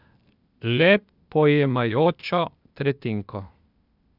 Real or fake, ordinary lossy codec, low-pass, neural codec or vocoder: fake; none; 5.4 kHz; codec, 16 kHz, 0.8 kbps, ZipCodec